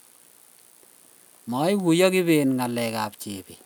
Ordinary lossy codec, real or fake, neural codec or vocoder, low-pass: none; real; none; none